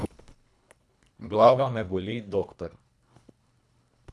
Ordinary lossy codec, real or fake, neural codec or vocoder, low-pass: none; fake; codec, 24 kHz, 1.5 kbps, HILCodec; none